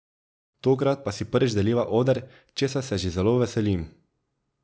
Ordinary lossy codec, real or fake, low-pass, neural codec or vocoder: none; real; none; none